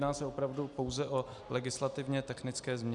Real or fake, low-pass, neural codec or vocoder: real; 10.8 kHz; none